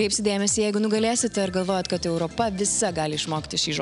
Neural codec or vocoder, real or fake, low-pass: none; real; 10.8 kHz